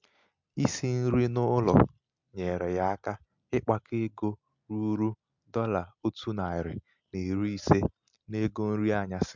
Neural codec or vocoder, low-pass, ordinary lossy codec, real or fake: none; 7.2 kHz; MP3, 64 kbps; real